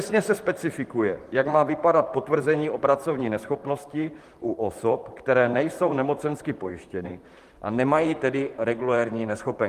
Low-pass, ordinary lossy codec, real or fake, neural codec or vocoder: 14.4 kHz; Opus, 24 kbps; fake; vocoder, 44.1 kHz, 128 mel bands, Pupu-Vocoder